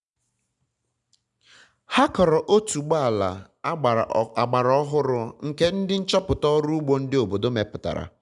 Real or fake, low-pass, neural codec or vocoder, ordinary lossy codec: real; 10.8 kHz; none; none